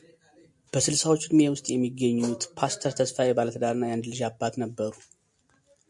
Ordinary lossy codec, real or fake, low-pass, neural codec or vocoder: MP3, 64 kbps; real; 10.8 kHz; none